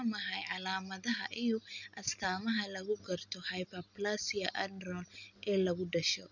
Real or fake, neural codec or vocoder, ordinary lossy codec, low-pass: real; none; none; 7.2 kHz